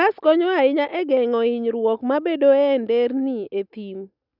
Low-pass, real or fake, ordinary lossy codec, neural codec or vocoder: 5.4 kHz; real; none; none